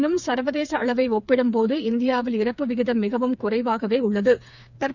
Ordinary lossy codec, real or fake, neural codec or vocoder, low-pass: none; fake; codec, 16 kHz, 4 kbps, FreqCodec, smaller model; 7.2 kHz